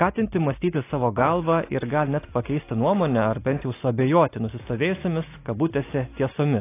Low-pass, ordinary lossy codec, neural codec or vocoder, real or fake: 3.6 kHz; AAC, 24 kbps; none; real